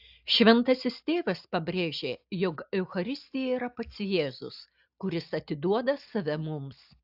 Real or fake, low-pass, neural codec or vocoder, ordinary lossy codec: real; 5.4 kHz; none; AAC, 48 kbps